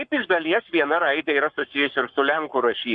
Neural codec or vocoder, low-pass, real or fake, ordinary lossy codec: codec, 44.1 kHz, 7.8 kbps, Pupu-Codec; 9.9 kHz; fake; Opus, 64 kbps